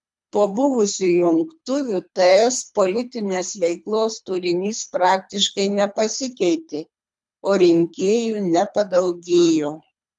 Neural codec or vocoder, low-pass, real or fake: codec, 24 kHz, 3 kbps, HILCodec; 10.8 kHz; fake